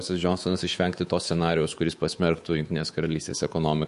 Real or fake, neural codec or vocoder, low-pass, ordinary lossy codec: fake; codec, 24 kHz, 3.1 kbps, DualCodec; 10.8 kHz; MP3, 48 kbps